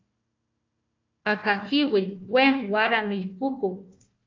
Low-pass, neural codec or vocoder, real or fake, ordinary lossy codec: 7.2 kHz; codec, 16 kHz, 1 kbps, FunCodec, trained on Chinese and English, 50 frames a second; fake; AAC, 48 kbps